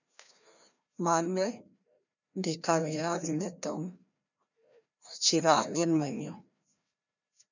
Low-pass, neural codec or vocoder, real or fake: 7.2 kHz; codec, 16 kHz, 1 kbps, FreqCodec, larger model; fake